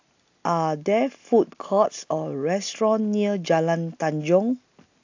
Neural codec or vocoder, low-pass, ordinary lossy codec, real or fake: none; 7.2 kHz; AAC, 48 kbps; real